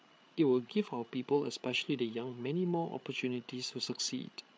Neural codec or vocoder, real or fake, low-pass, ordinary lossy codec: codec, 16 kHz, 8 kbps, FreqCodec, larger model; fake; none; none